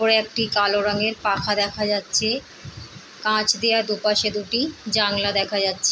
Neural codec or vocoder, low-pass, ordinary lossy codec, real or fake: none; none; none; real